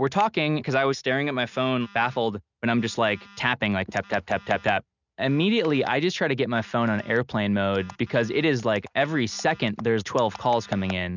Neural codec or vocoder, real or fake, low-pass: none; real; 7.2 kHz